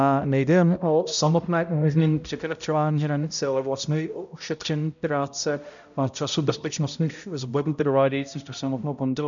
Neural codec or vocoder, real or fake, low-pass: codec, 16 kHz, 0.5 kbps, X-Codec, HuBERT features, trained on balanced general audio; fake; 7.2 kHz